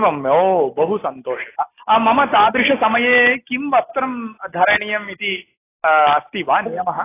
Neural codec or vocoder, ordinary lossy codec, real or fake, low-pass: none; AAC, 16 kbps; real; 3.6 kHz